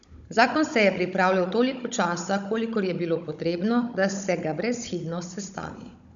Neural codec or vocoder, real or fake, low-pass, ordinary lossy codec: codec, 16 kHz, 16 kbps, FunCodec, trained on Chinese and English, 50 frames a second; fake; 7.2 kHz; none